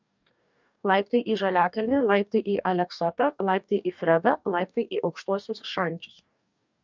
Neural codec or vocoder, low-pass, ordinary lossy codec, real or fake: codec, 44.1 kHz, 2.6 kbps, DAC; 7.2 kHz; MP3, 64 kbps; fake